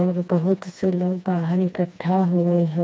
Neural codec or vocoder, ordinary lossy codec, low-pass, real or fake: codec, 16 kHz, 2 kbps, FreqCodec, smaller model; none; none; fake